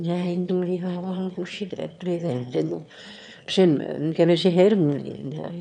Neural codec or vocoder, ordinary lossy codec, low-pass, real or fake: autoencoder, 22.05 kHz, a latent of 192 numbers a frame, VITS, trained on one speaker; none; 9.9 kHz; fake